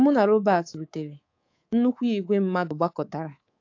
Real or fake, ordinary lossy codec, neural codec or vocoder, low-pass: fake; AAC, 48 kbps; codec, 24 kHz, 3.1 kbps, DualCodec; 7.2 kHz